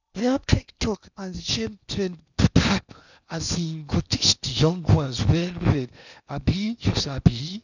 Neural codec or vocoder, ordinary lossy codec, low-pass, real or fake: codec, 16 kHz in and 24 kHz out, 0.8 kbps, FocalCodec, streaming, 65536 codes; none; 7.2 kHz; fake